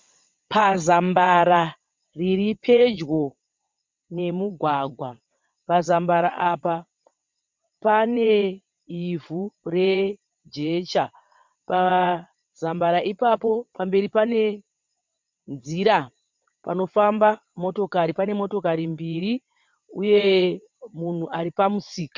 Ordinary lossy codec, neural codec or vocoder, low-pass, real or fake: MP3, 64 kbps; vocoder, 22.05 kHz, 80 mel bands, WaveNeXt; 7.2 kHz; fake